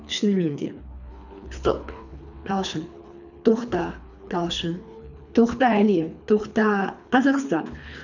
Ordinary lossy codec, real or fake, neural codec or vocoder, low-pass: none; fake; codec, 24 kHz, 3 kbps, HILCodec; 7.2 kHz